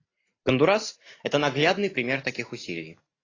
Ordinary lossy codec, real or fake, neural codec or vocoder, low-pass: AAC, 32 kbps; real; none; 7.2 kHz